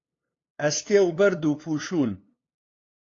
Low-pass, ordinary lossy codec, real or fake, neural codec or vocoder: 7.2 kHz; AAC, 32 kbps; fake; codec, 16 kHz, 2 kbps, FunCodec, trained on LibriTTS, 25 frames a second